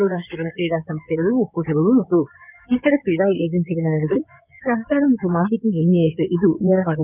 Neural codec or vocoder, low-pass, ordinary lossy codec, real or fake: codec, 16 kHz in and 24 kHz out, 2.2 kbps, FireRedTTS-2 codec; 3.6 kHz; none; fake